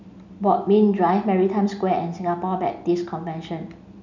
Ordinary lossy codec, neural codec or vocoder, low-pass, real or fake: none; none; 7.2 kHz; real